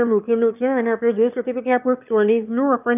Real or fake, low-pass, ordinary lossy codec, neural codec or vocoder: fake; 3.6 kHz; none; autoencoder, 22.05 kHz, a latent of 192 numbers a frame, VITS, trained on one speaker